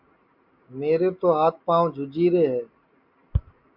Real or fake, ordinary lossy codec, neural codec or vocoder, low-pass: real; MP3, 48 kbps; none; 5.4 kHz